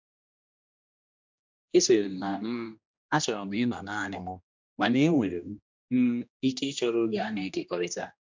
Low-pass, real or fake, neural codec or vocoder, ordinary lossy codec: 7.2 kHz; fake; codec, 16 kHz, 1 kbps, X-Codec, HuBERT features, trained on general audio; MP3, 64 kbps